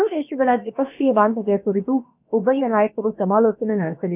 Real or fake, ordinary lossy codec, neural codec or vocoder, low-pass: fake; none; codec, 16 kHz, about 1 kbps, DyCAST, with the encoder's durations; 3.6 kHz